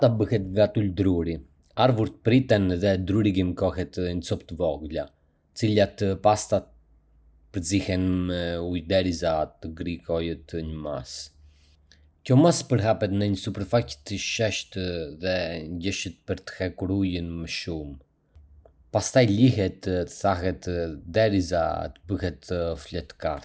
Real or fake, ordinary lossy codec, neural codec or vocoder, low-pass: real; none; none; none